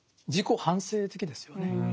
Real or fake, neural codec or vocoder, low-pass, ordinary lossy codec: real; none; none; none